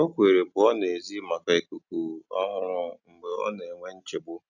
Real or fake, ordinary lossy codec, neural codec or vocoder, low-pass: real; none; none; 7.2 kHz